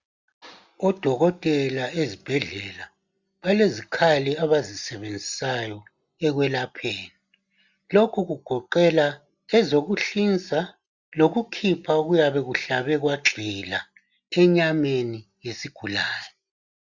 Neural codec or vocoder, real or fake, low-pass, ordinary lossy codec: none; real; 7.2 kHz; Opus, 64 kbps